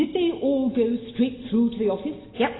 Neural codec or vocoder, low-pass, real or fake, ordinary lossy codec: none; 7.2 kHz; real; AAC, 16 kbps